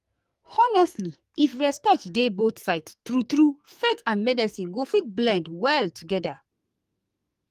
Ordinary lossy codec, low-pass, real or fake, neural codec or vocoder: Opus, 32 kbps; 14.4 kHz; fake; codec, 44.1 kHz, 2.6 kbps, SNAC